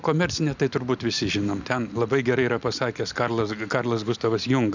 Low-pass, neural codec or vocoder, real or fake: 7.2 kHz; none; real